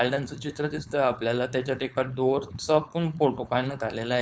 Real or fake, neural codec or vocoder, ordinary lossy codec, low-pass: fake; codec, 16 kHz, 4.8 kbps, FACodec; none; none